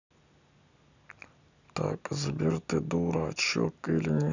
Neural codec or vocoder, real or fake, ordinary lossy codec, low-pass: none; real; none; 7.2 kHz